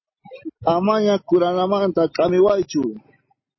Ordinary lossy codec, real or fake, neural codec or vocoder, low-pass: MP3, 24 kbps; real; none; 7.2 kHz